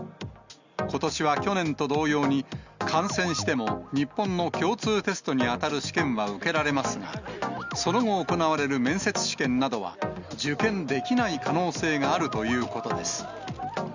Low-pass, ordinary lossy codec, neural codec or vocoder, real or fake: 7.2 kHz; Opus, 64 kbps; none; real